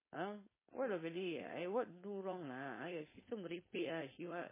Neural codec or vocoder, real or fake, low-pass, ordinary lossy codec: codec, 16 kHz, 4.8 kbps, FACodec; fake; 3.6 kHz; MP3, 16 kbps